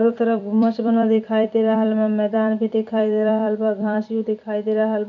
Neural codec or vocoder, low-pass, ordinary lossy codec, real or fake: vocoder, 44.1 kHz, 128 mel bands every 256 samples, BigVGAN v2; 7.2 kHz; none; fake